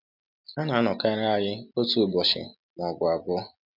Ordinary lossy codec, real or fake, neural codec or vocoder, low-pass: none; real; none; 5.4 kHz